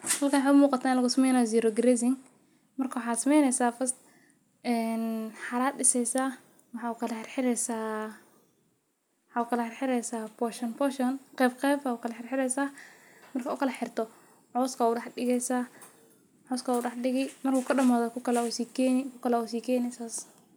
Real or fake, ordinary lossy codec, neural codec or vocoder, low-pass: real; none; none; none